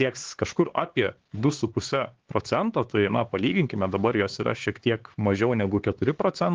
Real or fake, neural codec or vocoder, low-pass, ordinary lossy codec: fake; codec, 16 kHz, 4 kbps, X-Codec, HuBERT features, trained on LibriSpeech; 7.2 kHz; Opus, 16 kbps